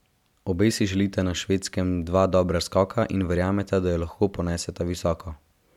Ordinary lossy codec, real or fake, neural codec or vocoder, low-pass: MP3, 96 kbps; real; none; 19.8 kHz